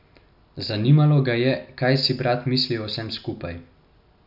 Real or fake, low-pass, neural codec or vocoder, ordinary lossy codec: real; 5.4 kHz; none; none